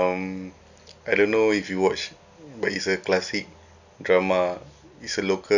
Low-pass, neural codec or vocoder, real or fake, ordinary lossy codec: 7.2 kHz; none; real; none